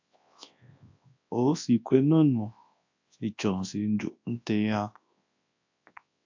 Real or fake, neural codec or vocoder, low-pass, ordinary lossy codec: fake; codec, 24 kHz, 0.9 kbps, WavTokenizer, large speech release; 7.2 kHz; AAC, 48 kbps